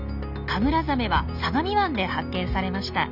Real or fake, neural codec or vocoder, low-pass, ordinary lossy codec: real; none; 5.4 kHz; none